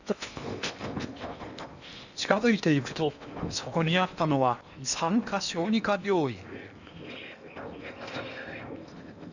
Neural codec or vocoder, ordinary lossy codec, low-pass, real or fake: codec, 16 kHz in and 24 kHz out, 0.8 kbps, FocalCodec, streaming, 65536 codes; none; 7.2 kHz; fake